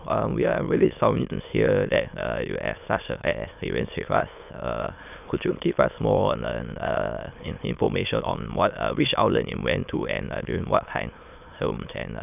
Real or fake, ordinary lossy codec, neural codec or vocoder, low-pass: fake; none; autoencoder, 22.05 kHz, a latent of 192 numbers a frame, VITS, trained on many speakers; 3.6 kHz